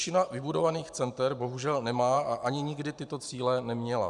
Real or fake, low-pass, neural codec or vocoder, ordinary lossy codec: fake; 10.8 kHz; vocoder, 44.1 kHz, 128 mel bands every 512 samples, BigVGAN v2; MP3, 96 kbps